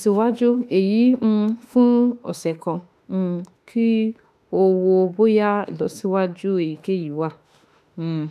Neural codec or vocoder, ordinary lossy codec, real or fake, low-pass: autoencoder, 48 kHz, 32 numbers a frame, DAC-VAE, trained on Japanese speech; none; fake; 14.4 kHz